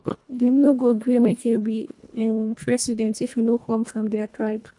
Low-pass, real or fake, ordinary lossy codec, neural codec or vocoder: 10.8 kHz; fake; none; codec, 24 kHz, 1.5 kbps, HILCodec